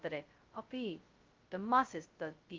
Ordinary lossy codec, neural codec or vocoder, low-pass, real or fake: Opus, 32 kbps; codec, 16 kHz, 0.2 kbps, FocalCodec; 7.2 kHz; fake